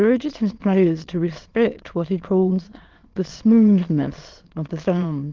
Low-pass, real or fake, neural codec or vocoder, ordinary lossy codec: 7.2 kHz; fake; autoencoder, 22.05 kHz, a latent of 192 numbers a frame, VITS, trained on many speakers; Opus, 16 kbps